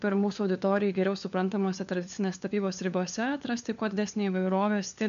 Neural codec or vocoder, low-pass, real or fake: codec, 16 kHz, 4 kbps, FunCodec, trained on LibriTTS, 50 frames a second; 7.2 kHz; fake